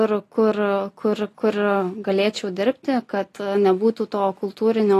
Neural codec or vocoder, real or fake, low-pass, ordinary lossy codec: none; real; 14.4 kHz; AAC, 48 kbps